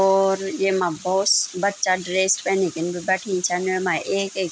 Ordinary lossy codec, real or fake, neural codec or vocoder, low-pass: none; real; none; none